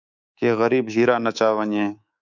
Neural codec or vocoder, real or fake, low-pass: codec, 24 kHz, 3.1 kbps, DualCodec; fake; 7.2 kHz